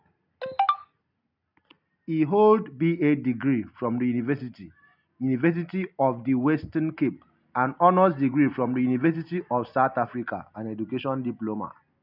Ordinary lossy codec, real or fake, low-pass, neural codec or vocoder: none; real; 5.4 kHz; none